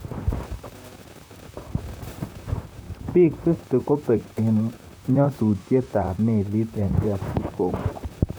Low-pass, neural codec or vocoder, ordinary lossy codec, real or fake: none; vocoder, 44.1 kHz, 128 mel bands, Pupu-Vocoder; none; fake